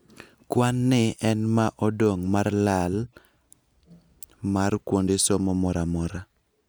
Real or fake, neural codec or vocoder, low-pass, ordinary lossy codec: real; none; none; none